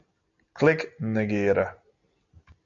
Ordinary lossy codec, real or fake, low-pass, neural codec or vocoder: MP3, 48 kbps; real; 7.2 kHz; none